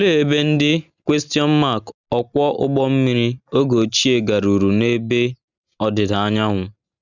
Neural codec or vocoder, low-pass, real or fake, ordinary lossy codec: none; 7.2 kHz; real; none